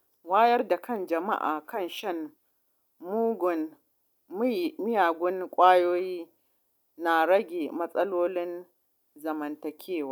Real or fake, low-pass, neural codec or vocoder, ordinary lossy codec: real; 19.8 kHz; none; none